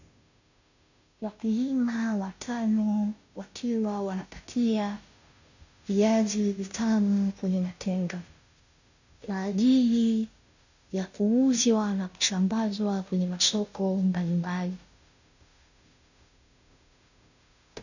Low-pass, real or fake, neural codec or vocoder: 7.2 kHz; fake; codec, 16 kHz, 0.5 kbps, FunCodec, trained on Chinese and English, 25 frames a second